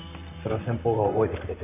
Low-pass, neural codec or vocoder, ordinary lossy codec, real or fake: 3.6 kHz; vocoder, 44.1 kHz, 128 mel bands, Pupu-Vocoder; none; fake